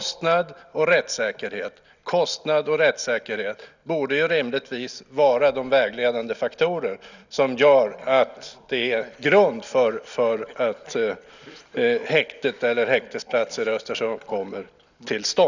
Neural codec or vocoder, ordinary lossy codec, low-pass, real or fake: none; none; 7.2 kHz; real